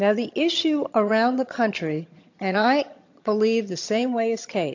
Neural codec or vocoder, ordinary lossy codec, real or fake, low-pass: vocoder, 22.05 kHz, 80 mel bands, HiFi-GAN; AAC, 48 kbps; fake; 7.2 kHz